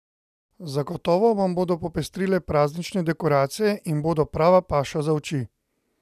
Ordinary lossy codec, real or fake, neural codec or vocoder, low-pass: none; real; none; 14.4 kHz